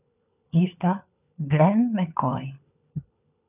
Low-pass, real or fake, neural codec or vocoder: 3.6 kHz; fake; codec, 16 kHz, 4 kbps, FunCodec, trained on LibriTTS, 50 frames a second